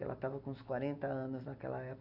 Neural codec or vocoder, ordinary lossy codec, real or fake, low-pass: none; none; real; 5.4 kHz